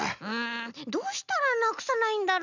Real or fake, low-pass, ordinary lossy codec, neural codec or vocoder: real; 7.2 kHz; none; none